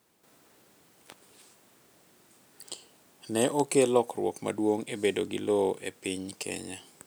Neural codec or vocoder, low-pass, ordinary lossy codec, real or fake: none; none; none; real